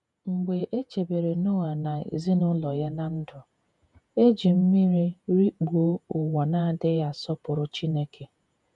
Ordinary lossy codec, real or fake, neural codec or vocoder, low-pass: none; fake; vocoder, 48 kHz, 128 mel bands, Vocos; 10.8 kHz